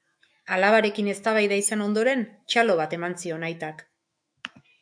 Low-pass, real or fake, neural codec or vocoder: 9.9 kHz; fake; autoencoder, 48 kHz, 128 numbers a frame, DAC-VAE, trained on Japanese speech